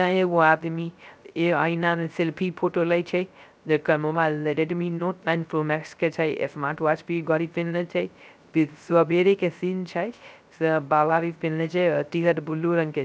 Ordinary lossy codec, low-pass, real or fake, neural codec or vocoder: none; none; fake; codec, 16 kHz, 0.3 kbps, FocalCodec